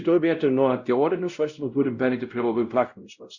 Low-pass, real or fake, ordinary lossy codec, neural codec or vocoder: 7.2 kHz; fake; Opus, 64 kbps; codec, 16 kHz, 0.5 kbps, X-Codec, WavLM features, trained on Multilingual LibriSpeech